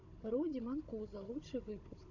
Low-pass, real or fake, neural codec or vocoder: 7.2 kHz; fake; codec, 16 kHz, 16 kbps, FunCodec, trained on Chinese and English, 50 frames a second